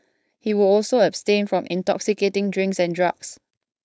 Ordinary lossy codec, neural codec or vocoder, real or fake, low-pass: none; codec, 16 kHz, 4.8 kbps, FACodec; fake; none